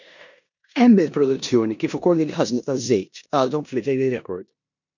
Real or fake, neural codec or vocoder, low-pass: fake; codec, 16 kHz in and 24 kHz out, 0.9 kbps, LongCat-Audio-Codec, four codebook decoder; 7.2 kHz